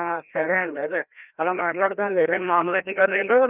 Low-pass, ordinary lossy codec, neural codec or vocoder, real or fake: 3.6 kHz; none; codec, 16 kHz, 1 kbps, FreqCodec, larger model; fake